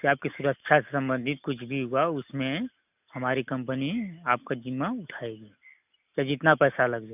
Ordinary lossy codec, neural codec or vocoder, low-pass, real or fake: none; none; 3.6 kHz; real